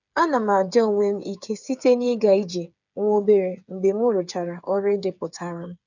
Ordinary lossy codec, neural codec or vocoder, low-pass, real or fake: none; codec, 16 kHz, 8 kbps, FreqCodec, smaller model; 7.2 kHz; fake